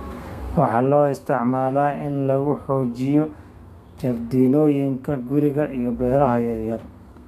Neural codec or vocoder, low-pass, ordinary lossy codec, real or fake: codec, 32 kHz, 1.9 kbps, SNAC; 14.4 kHz; none; fake